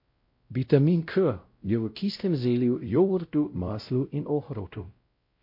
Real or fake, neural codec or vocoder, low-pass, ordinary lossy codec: fake; codec, 16 kHz, 0.5 kbps, X-Codec, WavLM features, trained on Multilingual LibriSpeech; 5.4 kHz; MP3, 32 kbps